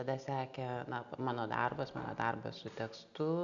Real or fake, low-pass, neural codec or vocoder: real; 7.2 kHz; none